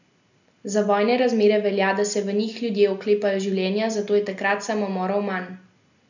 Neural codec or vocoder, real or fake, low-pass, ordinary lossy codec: none; real; 7.2 kHz; none